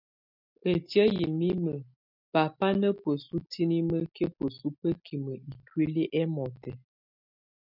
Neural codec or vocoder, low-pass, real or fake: none; 5.4 kHz; real